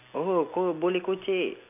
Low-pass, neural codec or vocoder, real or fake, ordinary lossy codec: 3.6 kHz; none; real; none